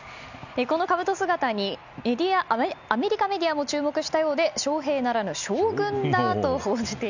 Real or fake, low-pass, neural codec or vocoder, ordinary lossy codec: real; 7.2 kHz; none; none